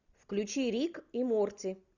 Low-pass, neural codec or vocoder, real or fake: 7.2 kHz; none; real